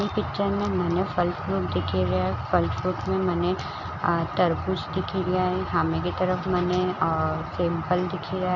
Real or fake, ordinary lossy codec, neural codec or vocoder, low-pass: real; none; none; 7.2 kHz